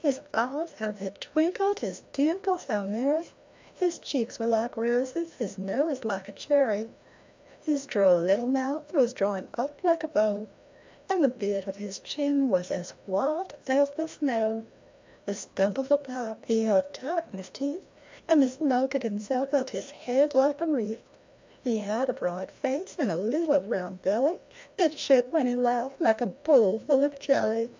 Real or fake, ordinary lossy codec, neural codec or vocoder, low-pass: fake; MP3, 64 kbps; codec, 16 kHz, 1 kbps, FreqCodec, larger model; 7.2 kHz